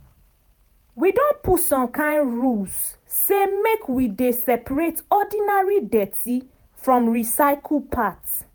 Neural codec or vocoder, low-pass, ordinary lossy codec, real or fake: vocoder, 48 kHz, 128 mel bands, Vocos; none; none; fake